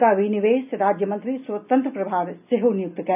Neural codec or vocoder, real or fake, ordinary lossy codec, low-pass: none; real; none; 3.6 kHz